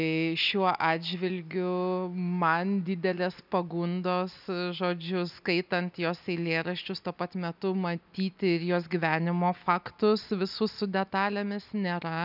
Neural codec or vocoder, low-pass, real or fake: none; 5.4 kHz; real